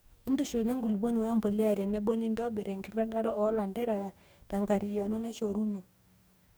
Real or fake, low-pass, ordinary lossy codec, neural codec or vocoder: fake; none; none; codec, 44.1 kHz, 2.6 kbps, DAC